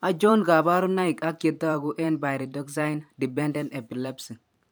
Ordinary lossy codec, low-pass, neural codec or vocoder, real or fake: none; none; vocoder, 44.1 kHz, 128 mel bands every 512 samples, BigVGAN v2; fake